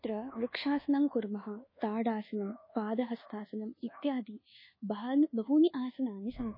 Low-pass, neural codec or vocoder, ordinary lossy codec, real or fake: 5.4 kHz; codec, 24 kHz, 1.2 kbps, DualCodec; MP3, 24 kbps; fake